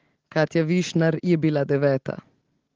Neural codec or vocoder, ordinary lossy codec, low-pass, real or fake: none; Opus, 16 kbps; 7.2 kHz; real